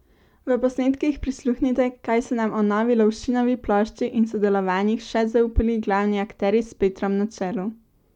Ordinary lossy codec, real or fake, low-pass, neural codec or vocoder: none; real; 19.8 kHz; none